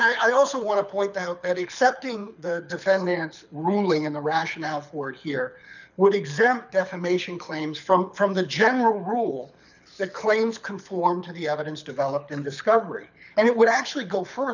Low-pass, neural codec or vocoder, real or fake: 7.2 kHz; codec, 24 kHz, 6 kbps, HILCodec; fake